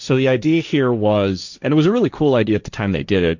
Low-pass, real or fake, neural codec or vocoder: 7.2 kHz; fake; codec, 16 kHz, 1.1 kbps, Voila-Tokenizer